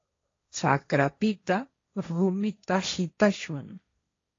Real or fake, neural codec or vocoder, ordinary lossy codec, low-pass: fake; codec, 16 kHz, 1.1 kbps, Voila-Tokenizer; AAC, 32 kbps; 7.2 kHz